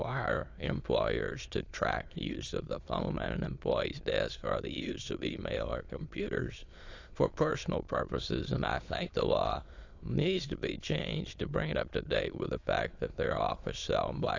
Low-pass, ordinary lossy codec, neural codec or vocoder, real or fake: 7.2 kHz; AAC, 48 kbps; autoencoder, 22.05 kHz, a latent of 192 numbers a frame, VITS, trained on many speakers; fake